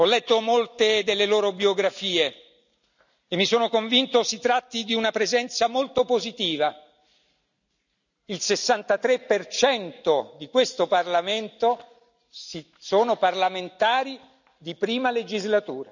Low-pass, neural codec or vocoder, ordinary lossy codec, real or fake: 7.2 kHz; none; none; real